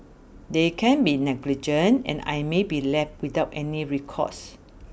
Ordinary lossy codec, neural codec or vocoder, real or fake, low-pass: none; none; real; none